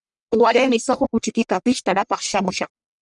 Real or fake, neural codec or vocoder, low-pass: fake; codec, 44.1 kHz, 1.7 kbps, Pupu-Codec; 10.8 kHz